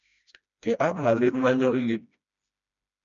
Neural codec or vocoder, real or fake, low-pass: codec, 16 kHz, 1 kbps, FreqCodec, smaller model; fake; 7.2 kHz